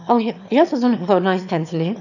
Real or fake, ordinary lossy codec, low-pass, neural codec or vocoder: fake; none; 7.2 kHz; autoencoder, 22.05 kHz, a latent of 192 numbers a frame, VITS, trained on one speaker